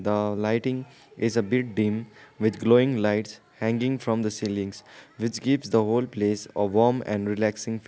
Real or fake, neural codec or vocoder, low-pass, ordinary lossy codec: real; none; none; none